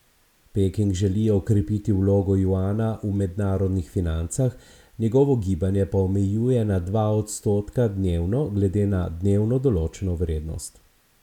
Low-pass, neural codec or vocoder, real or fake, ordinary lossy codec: 19.8 kHz; none; real; none